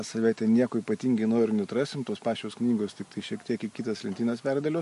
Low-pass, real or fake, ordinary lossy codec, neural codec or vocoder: 14.4 kHz; real; MP3, 48 kbps; none